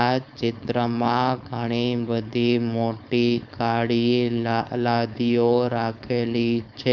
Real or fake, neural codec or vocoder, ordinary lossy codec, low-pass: fake; codec, 16 kHz, 4.8 kbps, FACodec; none; none